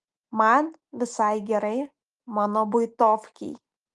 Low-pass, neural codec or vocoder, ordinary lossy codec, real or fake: 10.8 kHz; none; Opus, 24 kbps; real